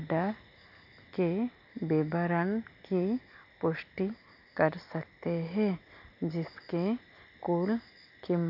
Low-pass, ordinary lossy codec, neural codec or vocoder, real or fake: 5.4 kHz; none; none; real